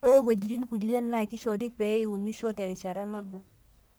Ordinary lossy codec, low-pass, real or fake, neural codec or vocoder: none; none; fake; codec, 44.1 kHz, 1.7 kbps, Pupu-Codec